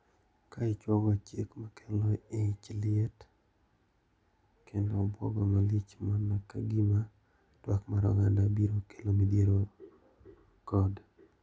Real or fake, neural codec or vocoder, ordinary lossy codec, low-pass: real; none; none; none